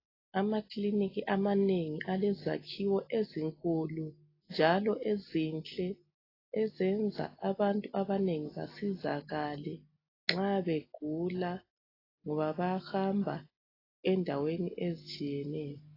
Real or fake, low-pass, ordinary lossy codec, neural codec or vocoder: real; 5.4 kHz; AAC, 24 kbps; none